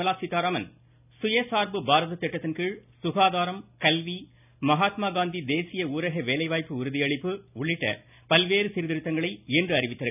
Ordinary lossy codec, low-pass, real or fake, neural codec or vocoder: none; 3.6 kHz; real; none